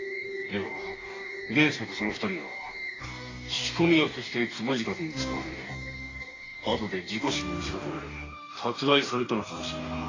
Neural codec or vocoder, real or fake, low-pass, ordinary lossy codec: codec, 44.1 kHz, 2.6 kbps, DAC; fake; 7.2 kHz; AAC, 32 kbps